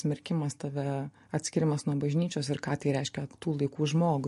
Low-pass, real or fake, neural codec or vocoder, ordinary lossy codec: 14.4 kHz; real; none; MP3, 48 kbps